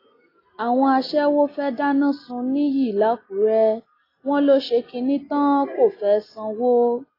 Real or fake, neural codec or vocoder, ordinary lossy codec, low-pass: real; none; AAC, 24 kbps; 5.4 kHz